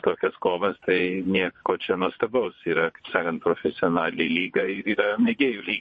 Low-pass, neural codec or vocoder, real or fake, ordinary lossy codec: 9.9 kHz; vocoder, 22.05 kHz, 80 mel bands, WaveNeXt; fake; MP3, 32 kbps